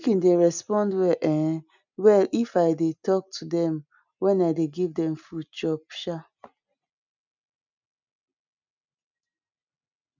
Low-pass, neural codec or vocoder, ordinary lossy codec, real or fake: 7.2 kHz; none; none; real